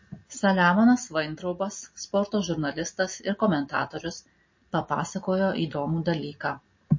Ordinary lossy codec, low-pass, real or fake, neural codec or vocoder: MP3, 32 kbps; 7.2 kHz; real; none